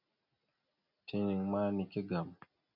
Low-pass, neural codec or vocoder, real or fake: 5.4 kHz; none; real